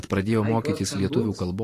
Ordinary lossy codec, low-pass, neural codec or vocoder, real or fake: AAC, 48 kbps; 14.4 kHz; vocoder, 44.1 kHz, 128 mel bands every 512 samples, BigVGAN v2; fake